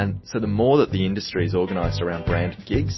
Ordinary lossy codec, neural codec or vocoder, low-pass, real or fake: MP3, 24 kbps; none; 7.2 kHz; real